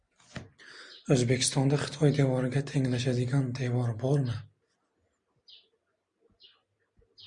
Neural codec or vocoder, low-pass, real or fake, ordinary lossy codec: none; 9.9 kHz; real; MP3, 96 kbps